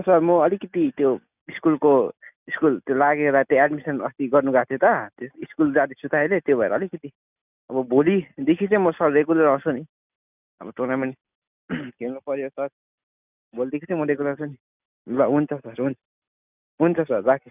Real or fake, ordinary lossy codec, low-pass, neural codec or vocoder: real; none; 3.6 kHz; none